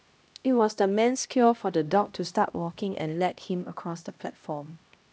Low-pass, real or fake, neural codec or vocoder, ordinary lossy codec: none; fake; codec, 16 kHz, 1 kbps, X-Codec, HuBERT features, trained on LibriSpeech; none